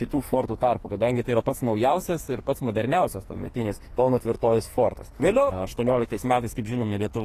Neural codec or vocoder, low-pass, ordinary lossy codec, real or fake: codec, 44.1 kHz, 2.6 kbps, SNAC; 14.4 kHz; AAC, 48 kbps; fake